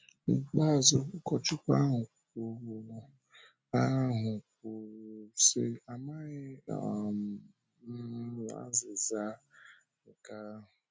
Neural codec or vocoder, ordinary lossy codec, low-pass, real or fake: none; none; none; real